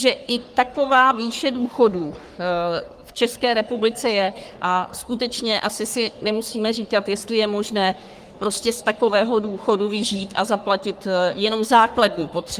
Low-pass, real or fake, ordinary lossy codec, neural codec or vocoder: 14.4 kHz; fake; Opus, 32 kbps; codec, 44.1 kHz, 3.4 kbps, Pupu-Codec